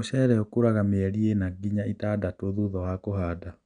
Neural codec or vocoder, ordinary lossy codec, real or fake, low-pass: none; none; real; 9.9 kHz